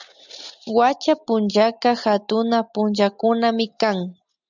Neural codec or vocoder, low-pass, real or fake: none; 7.2 kHz; real